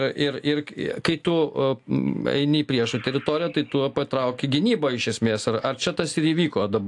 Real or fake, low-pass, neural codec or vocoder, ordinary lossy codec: real; 10.8 kHz; none; AAC, 64 kbps